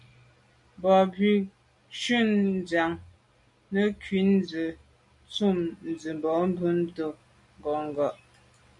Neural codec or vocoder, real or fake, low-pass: none; real; 10.8 kHz